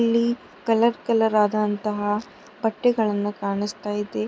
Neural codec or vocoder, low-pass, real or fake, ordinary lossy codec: none; none; real; none